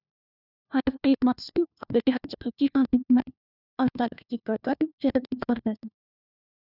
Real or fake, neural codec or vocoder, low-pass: fake; codec, 16 kHz, 1 kbps, FunCodec, trained on LibriTTS, 50 frames a second; 5.4 kHz